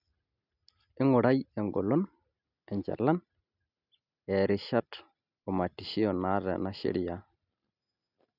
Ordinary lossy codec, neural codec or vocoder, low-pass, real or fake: none; none; 5.4 kHz; real